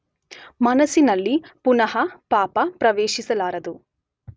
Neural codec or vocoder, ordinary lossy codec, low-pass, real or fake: none; none; none; real